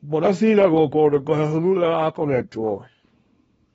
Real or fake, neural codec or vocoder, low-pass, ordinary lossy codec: fake; codec, 24 kHz, 0.9 kbps, WavTokenizer, small release; 10.8 kHz; AAC, 24 kbps